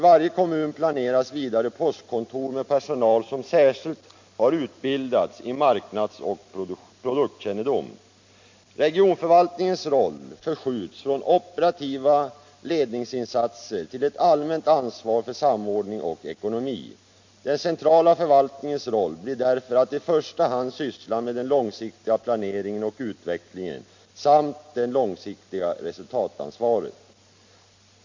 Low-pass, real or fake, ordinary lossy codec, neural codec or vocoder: 7.2 kHz; real; MP3, 48 kbps; none